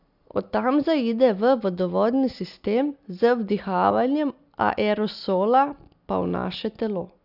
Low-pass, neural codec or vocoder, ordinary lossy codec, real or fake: 5.4 kHz; none; none; real